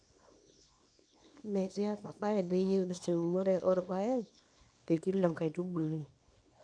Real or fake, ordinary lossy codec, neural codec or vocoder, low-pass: fake; none; codec, 24 kHz, 0.9 kbps, WavTokenizer, small release; 9.9 kHz